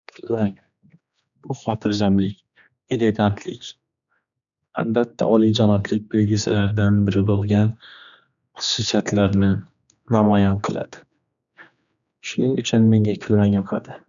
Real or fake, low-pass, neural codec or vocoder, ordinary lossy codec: fake; 7.2 kHz; codec, 16 kHz, 2 kbps, X-Codec, HuBERT features, trained on general audio; none